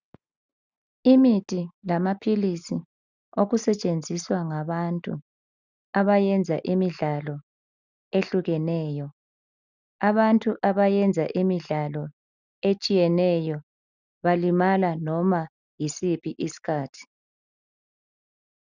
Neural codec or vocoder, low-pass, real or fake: none; 7.2 kHz; real